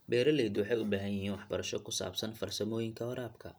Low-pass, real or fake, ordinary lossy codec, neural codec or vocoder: none; real; none; none